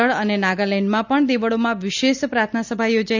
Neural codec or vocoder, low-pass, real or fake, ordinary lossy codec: none; 7.2 kHz; real; none